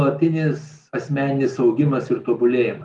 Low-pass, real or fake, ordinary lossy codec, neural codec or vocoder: 10.8 kHz; real; Opus, 32 kbps; none